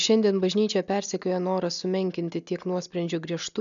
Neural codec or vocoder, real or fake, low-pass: none; real; 7.2 kHz